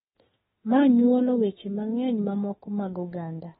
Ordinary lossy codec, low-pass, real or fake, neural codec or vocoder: AAC, 16 kbps; 19.8 kHz; fake; autoencoder, 48 kHz, 32 numbers a frame, DAC-VAE, trained on Japanese speech